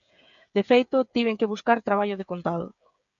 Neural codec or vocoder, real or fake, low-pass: codec, 16 kHz, 8 kbps, FreqCodec, smaller model; fake; 7.2 kHz